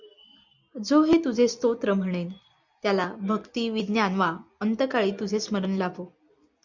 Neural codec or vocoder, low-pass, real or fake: none; 7.2 kHz; real